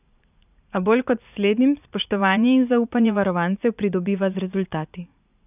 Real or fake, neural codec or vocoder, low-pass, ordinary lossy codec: fake; vocoder, 44.1 kHz, 80 mel bands, Vocos; 3.6 kHz; none